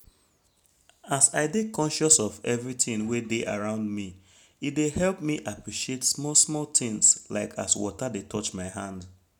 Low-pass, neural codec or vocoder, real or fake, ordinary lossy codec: none; none; real; none